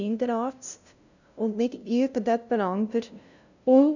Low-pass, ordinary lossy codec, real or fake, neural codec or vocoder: 7.2 kHz; none; fake; codec, 16 kHz, 0.5 kbps, FunCodec, trained on LibriTTS, 25 frames a second